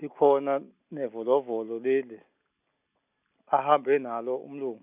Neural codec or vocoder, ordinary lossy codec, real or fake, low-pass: none; none; real; 3.6 kHz